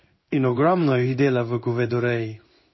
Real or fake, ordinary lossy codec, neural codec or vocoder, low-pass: fake; MP3, 24 kbps; codec, 16 kHz in and 24 kHz out, 1 kbps, XY-Tokenizer; 7.2 kHz